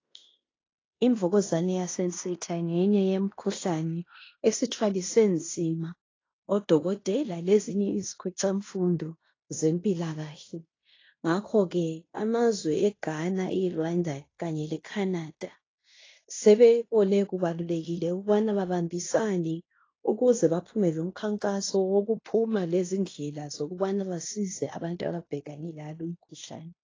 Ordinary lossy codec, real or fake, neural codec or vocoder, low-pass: AAC, 32 kbps; fake; codec, 16 kHz in and 24 kHz out, 0.9 kbps, LongCat-Audio-Codec, fine tuned four codebook decoder; 7.2 kHz